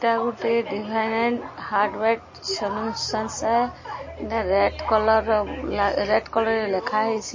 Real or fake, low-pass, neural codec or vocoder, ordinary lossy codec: real; 7.2 kHz; none; MP3, 32 kbps